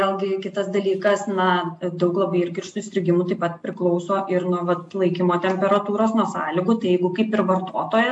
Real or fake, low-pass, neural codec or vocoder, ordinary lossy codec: real; 10.8 kHz; none; AAC, 64 kbps